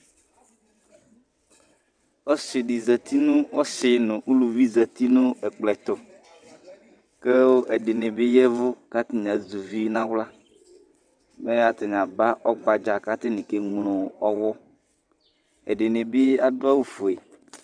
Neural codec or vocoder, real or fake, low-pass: vocoder, 22.05 kHz, 80 mel bands, WaveNeXt; fake; 9.9 kHz